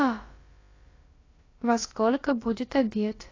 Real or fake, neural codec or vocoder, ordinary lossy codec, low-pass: fake; codec, 16 kHz, about 1 kbps, DyCAST, with the encoder's durations; AAC, 32 kbps; 7.2 kHz